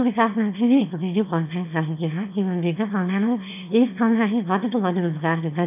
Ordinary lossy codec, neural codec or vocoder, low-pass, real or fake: AAC, 32 kbps; autoencoder, 22.05 kHz, a latent of 192 numbers a frame, VITS, trained on one speaker; 3.6 kHz; fake